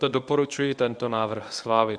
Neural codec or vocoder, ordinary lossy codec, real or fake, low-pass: codec, 24 kHz, 0.9 kbps, WavTokenizer, medium speech release version 1; Opus, 64 kbps; fake; 9.9 kHz